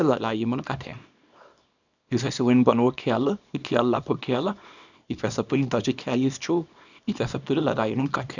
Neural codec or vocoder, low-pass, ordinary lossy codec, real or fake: codec, 24 kHz, 0.9 kbps, WavTokenizer, small release; 7.2 kHz; none; fake